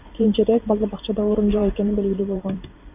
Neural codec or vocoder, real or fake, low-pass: vocoder, 44.1 kHz, 128 mel bands every 512 samples, BigVGAN v2; fake; 3.6 kHz